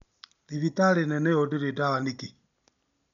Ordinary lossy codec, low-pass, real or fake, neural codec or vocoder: none; 7.2 kHz; real; none